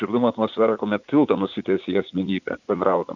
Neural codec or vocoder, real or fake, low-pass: vocoder, 44.1 kHz, 80 mel bands, Vocos; fake; 7.2 kHz